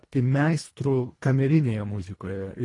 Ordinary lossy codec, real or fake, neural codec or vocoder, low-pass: AAC, 32 kbps; fake; codec, 24 kHz, 1.5 kbps, HILCodec; 10.8 kHz